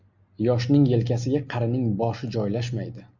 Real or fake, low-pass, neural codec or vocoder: real; 7.2 kHz; none